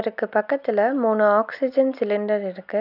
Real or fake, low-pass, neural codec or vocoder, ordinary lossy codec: real; 5.4 kHz; none; none